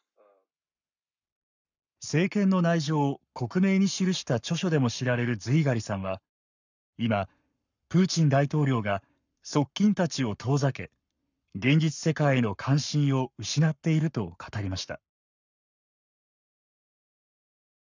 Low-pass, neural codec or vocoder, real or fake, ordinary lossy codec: 7.2 kHz; codec, 44.1 kHz, 7.8 kbps, Pupu-Codec; fake; none